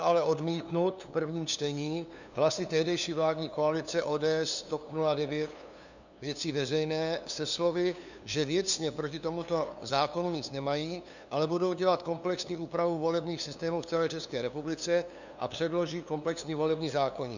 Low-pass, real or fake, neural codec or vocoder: 7.2 kHz; fake; codec, 16 kHz, 2 kbps, FunCodec, trained on LibriTTS, 25 frames a second